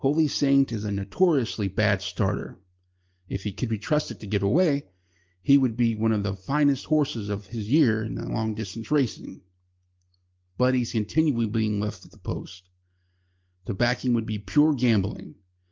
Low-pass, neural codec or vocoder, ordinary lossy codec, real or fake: 7.2 kHz; none; Opus, 32 kbps; real